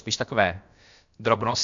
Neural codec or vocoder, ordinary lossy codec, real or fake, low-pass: codec, 16 kHz, about 1 kbps, DyCAST, with the encoder's durations; MP3, 64 kbps; fake; 7.2 kHz